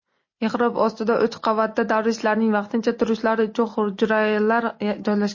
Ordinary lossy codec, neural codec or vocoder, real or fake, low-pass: MP3, 32 kbps; none; real; 7.2 kHz